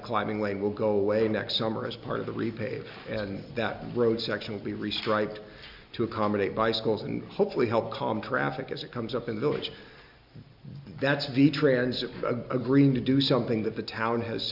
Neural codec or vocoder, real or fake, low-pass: none; real; 5.4 kHz